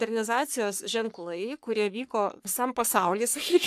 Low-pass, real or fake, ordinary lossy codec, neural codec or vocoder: 14.4 kHz; fake; AAC, 96 kbps; codec, 44.1 kHz, 3.4 kbps, Pupu-Codec